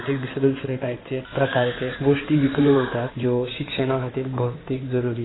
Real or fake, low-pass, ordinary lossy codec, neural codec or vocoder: fake; 7.2 kHz; AAC, 16 kbps; codec, 16 kHz in and 24 kHz out, 2.2 kbps, FireRedTTS-2 codec